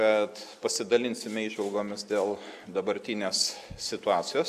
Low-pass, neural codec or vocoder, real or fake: 14.4 kHz; none; real